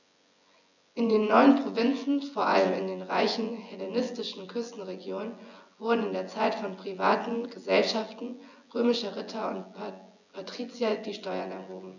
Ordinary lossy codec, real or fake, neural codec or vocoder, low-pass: none; fake; vocoder, 24 kHz, 100 mel bands, Vocos; 7.2 kHz